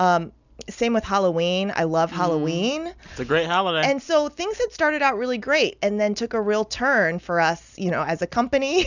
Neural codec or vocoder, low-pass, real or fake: none; 7.2 kHz; real